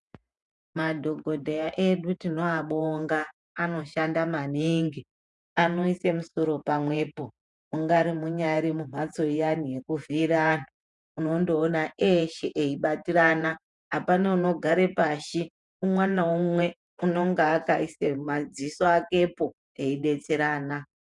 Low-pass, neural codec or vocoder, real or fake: 10.8 kHz; vocoder, 48 kHz, 128 mel bands, Vocos; fake